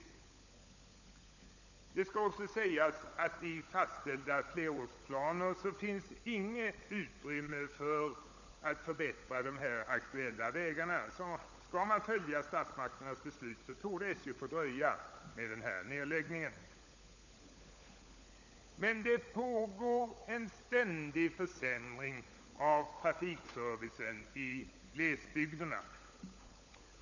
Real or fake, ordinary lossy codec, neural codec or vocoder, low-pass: fake; none; codec, 16 kHz, 16 kbps, FunCodec, trained on LibriTTS, 50 frames a second; 7.2 kHz